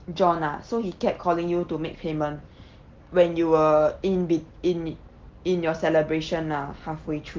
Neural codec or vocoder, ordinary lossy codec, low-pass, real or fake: none; Opus, 16 kbps; 7.2 kHz; real